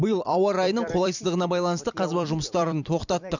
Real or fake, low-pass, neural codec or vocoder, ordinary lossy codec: fake; 7.2 kHz; vocoder, 44.1 kHz, 128 mel bands every 256 samples, BigVGAN v2; none